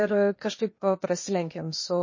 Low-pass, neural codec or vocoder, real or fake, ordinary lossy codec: 7.2 kHz; codec, 16 kHz, 0.8 kbps, ZipCodec; fake; MP3, 32 kbps